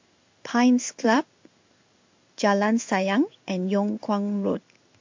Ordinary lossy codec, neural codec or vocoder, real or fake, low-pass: MP3, 48 kbps; codec, 16 kHz in and 24 kHz out, 1 kbps, XY-Tokenizer; fake; 7.2 kHz